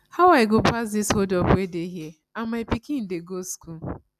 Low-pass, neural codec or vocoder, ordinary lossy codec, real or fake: 14.4 kHz; none; none; real